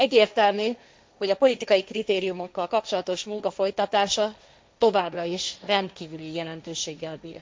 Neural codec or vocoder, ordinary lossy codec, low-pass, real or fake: codec, 16 kHz, 1.1 kbps, Voila-Tokenizer; none; none; fake